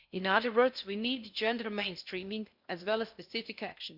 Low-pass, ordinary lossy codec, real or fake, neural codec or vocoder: 5.4 kHz; none; fake; codec, 16 kHz in and 24 kHz out, 0.6 kbps, FocalCodec, streaming, 4096 codes